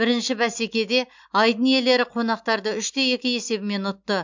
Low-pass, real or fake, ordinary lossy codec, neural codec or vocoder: 7.2 kHz; real; MP3, 64 kbps; none